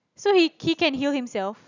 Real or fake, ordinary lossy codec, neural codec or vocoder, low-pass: real; none; none; 7.2 kHz